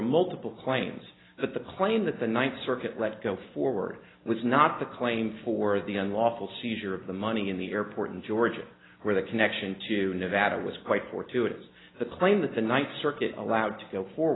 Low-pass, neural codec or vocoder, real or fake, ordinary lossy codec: 7.2 kHz; none; real; AAC, 16 kbps